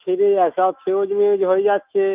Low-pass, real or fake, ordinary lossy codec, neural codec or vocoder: 3.6 kHz; fake; Opus, 24 kbps; autoencoder, 48 kHz, 128 numbers a frame, DAC-VAE, trained on Japanese speech